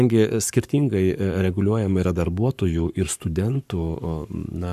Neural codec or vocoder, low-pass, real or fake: codec, 44.1 kHz, 7.8 kbps, Pupu-Codec; 14.4 kHz; fake